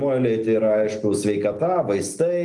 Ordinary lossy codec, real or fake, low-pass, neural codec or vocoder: Opus, 24 kbps; real; 10.8 kHz; none